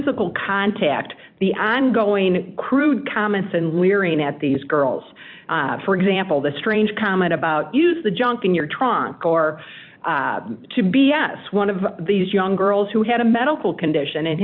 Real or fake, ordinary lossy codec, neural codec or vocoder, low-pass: fake; MP3, 48 kbps; vocoder, 44.1 kHz, 128 mel bands every 256 samples, BigVGAN v2; 5.4 kHz